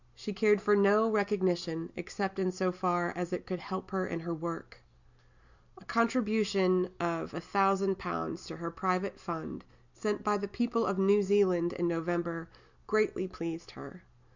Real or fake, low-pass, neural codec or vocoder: real; 7.2 kHz; none